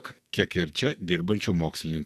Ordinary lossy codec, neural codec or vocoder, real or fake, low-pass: Opus, 64 kbps; codec, 44.1 kHz, 2.6 kbps, SNAC; fake; 14.4 kHz